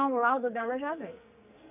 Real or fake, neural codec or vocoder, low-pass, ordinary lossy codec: fake; codec, 44.1 kHz, 2.6 kbps, SNAC; 3.6 kHz; none